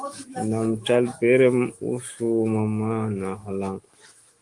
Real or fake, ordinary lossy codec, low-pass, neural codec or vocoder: fake; Opus, 24 kbps; 10.8 kHz; autoencoder, 48 kHz, 128 numbers a frame, DAC-VAE, trained on Japanese speech